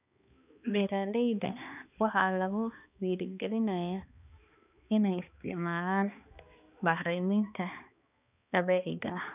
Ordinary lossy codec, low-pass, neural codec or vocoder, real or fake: none; 3.6 kHz; codec, 16 kHz, 2 kbps, X-Codec, HuBERT features, trained on balanced general audio; fake